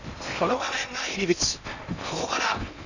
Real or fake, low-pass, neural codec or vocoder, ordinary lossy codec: fake; 7.2 kHz; codec, 16 kHz in and 24 kHz out, 0.8 kbps, FocalCodec, streaming, 65536 codes; none